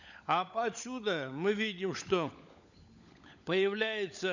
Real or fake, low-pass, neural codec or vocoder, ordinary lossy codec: fake; 7.2 kHz; codec, 16 kHz, 16 kbps, FunCodec, trained on LibriTTS, 50 frames a second; none